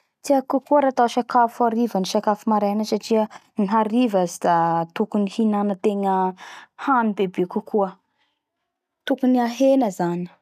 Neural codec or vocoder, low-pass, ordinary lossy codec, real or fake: none; 14.4 kHz; none; real